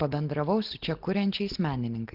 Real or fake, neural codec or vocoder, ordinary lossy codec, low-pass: real; none; Opus, 16 kbps; 5.4 kHz